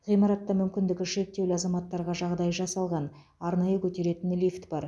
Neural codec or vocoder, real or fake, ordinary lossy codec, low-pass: none; real; none; none